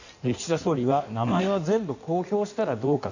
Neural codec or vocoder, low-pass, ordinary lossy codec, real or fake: codec, 16 kHz in and 24 kHz out, 1.1 kbps, FireRedTTS-2 codec; 7.2 kHz; none; fake